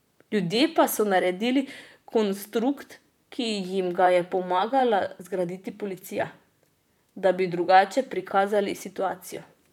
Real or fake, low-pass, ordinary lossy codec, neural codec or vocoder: fake; 19.8 kHz; none; vocoder, 44.1 kHz, 128 mel bands, Pupu-Vocoder